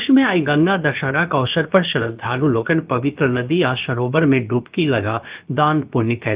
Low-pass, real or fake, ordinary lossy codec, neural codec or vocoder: 3.6 kHz; fake; Opus, 32 kbps; codec, 16 kHz, about 1 kbps, DyCAST, with the encoder's durations